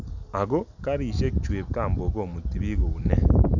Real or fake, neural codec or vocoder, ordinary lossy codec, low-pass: real; none; none; 7.2 kHz